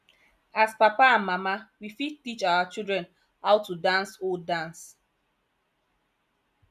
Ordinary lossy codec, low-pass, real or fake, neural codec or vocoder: none; 14.4 kHz; real; none